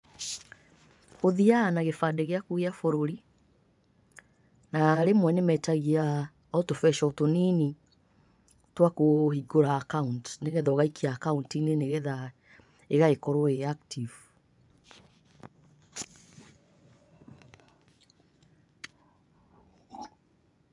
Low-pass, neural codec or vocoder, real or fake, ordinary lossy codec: 10.8 kHz; vocoder, 24 kHz, 100 mel bands, Vocos; fake; none